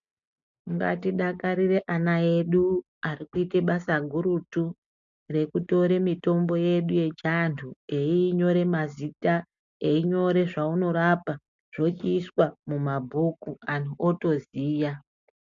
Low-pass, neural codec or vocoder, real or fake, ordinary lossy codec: 7.2 kHz; none; real; MP3, 64 kbps